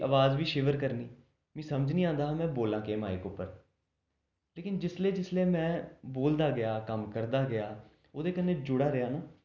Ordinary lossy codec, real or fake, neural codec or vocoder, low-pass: none; real; none; 7.2 kHz